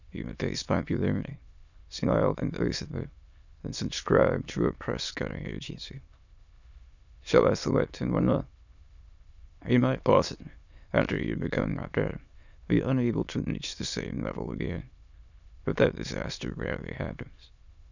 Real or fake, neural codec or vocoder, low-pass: fake; autoencoder, 22.05 kHz, a latent of 192 numbers a frame, VITS, trained on many speakers; 7.2 kHz